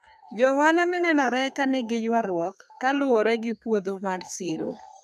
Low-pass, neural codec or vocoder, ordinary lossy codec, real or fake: 14.4 kHz; codec, 32 kHz, 1.9 kbps, SNAC; none; fake